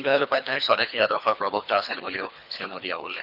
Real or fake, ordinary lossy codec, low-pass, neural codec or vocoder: fake; none; 5.4 kHz; codec, 24 kHz, 3 kbps, HILCodec